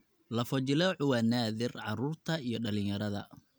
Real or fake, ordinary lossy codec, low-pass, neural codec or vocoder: real; none; none; none